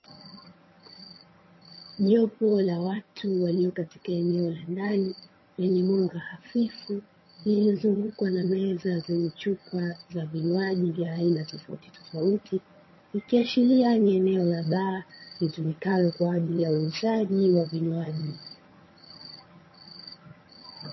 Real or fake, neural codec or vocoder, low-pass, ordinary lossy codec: fake; vocoder, 22.05 kHz, 80 mel bands, HiFi-GAN; 7.2 kHz; MP3, 24 kbps